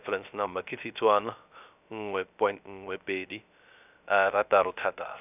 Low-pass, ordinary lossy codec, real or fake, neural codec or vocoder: 3.6 kHz; none; fake; codec, 16 kHz, 0.3 kbps, FocalCodec